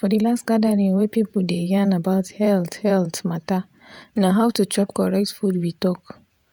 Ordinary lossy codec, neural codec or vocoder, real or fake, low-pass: none; vocoder, 48 kHz, 128 mel bands, Vocos; fake; none